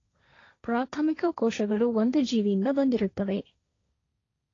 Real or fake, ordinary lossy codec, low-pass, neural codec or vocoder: fake; AAC, 32 kbps; 7.2 kHz; codec, 16 kHz, 1.1 kbps, Voila-Tokenizer